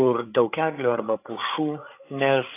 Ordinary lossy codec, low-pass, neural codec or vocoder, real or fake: AAC, 24 kbps; 3.6 kHz; codec, 16 kHz, 8 kbps, FreqCodec, larger model; fake